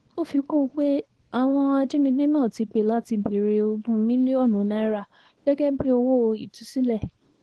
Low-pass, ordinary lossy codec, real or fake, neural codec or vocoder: 10.8 kHz; Opus, 16 kbps; fake; codec, 24 kHz, 0.9 kbps, WavTokenizer, small release